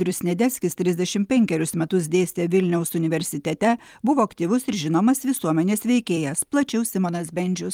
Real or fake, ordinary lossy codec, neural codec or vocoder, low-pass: fake; Opus, 32 kbps; vocoder, 44.1 kHz, 128 mel bands every 256 samples, BigVGAN v2; 19.8 kHz